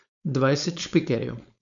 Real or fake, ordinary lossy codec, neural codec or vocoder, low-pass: fake; MP3, 96 kbps; codec, 16 kHz, 4.8 kbps, FACodec; 7.2 kHz